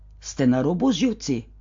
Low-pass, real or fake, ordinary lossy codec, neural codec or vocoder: 7.2 kHz; real; MP3, 48 kbps; none